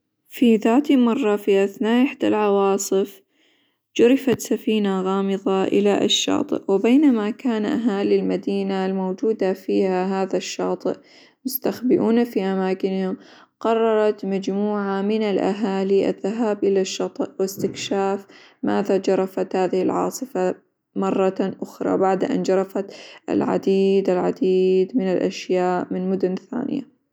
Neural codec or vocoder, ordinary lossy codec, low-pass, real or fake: none; none; none; real